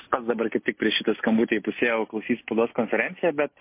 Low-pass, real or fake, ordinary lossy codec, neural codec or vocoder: 3.6 kHz; real; MP3, 24 kbps; none